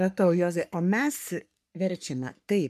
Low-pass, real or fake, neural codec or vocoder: 14.4 kHz; fake; codec, 44.1 kHz, 3.4 kbps, Pupu-Codec